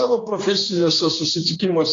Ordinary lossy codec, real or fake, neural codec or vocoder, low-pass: AAC, 32 kbps; fake; codec, 16 kHz, 2 kbps, X-Codec, HuBERT features, trained on general audio; 7.2 kHz